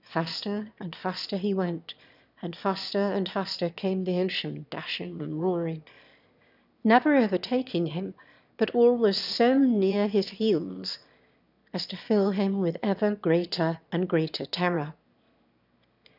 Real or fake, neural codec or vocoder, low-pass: fake; autoencoder, 22.05 kHz, a latent of 192 numbers a frame, VITS, trained on one speaker; 5.4 kHz